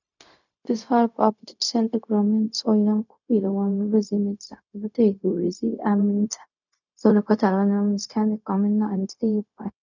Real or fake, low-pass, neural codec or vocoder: fake; 7.2 kHz; codec, 16 kHz, 0.4 kbps, LongCat-Audio-Codec